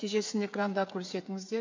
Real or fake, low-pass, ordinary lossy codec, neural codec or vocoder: fake; 7.2 kHz; MP3, 48 kbps; codec, 16 kHz, 8 kbps, FreqCodec, smaller model